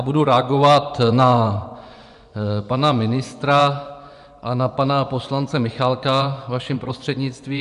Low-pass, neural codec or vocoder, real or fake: 10.8 kHz; vocoder, 24 kHz, 100 mel bands, Vocos; fake